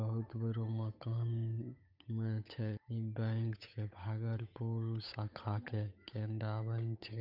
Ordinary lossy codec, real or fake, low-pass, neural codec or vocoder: none; fake; 5.4 kHz; codec, 16 kHz, 8 kbps, FunCodec, trained on Chinese and English, 25 frames a second